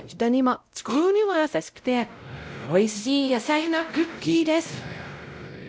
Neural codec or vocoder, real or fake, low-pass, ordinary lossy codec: codec, 16 kHz, 0.5 kbps, X-Codec, WavLM features, trained on Multilingual LibriSpeech; fake; none; none